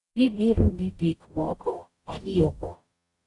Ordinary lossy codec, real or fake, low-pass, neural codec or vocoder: AAC, 48 kbps; fake; 10.8 kHz; codec, 44.1 kHz, 0.9 kbps, DAC